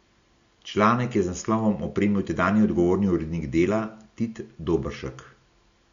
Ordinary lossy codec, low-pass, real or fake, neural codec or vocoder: Opus, 64 kbps; 7.2 kHz; real; none